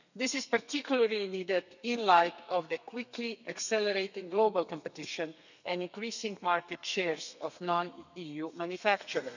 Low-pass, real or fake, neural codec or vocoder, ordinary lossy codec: 7.2 kHz; fake; codec, 32 kHz, 1.9 kbps, SNAC; none